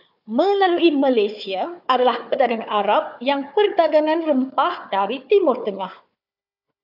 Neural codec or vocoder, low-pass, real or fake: codec, 16 kHz, 4 kbps, FunCodec, trained on Chinese and English, 50 frames a second; 5.4 kHz; fake